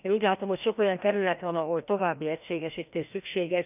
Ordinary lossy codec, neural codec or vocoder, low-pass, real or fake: none; codec, 16 kHz, 1 kbps, FreqCodec, larger model; 3.6 kHz; fake